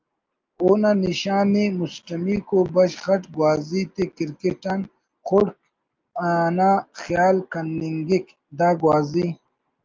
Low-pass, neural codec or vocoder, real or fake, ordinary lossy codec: 7.2 kHz; none; real; Opus, 24 kbps